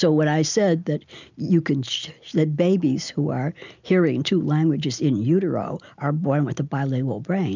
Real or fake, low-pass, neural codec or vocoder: real; 7.2 kHz; none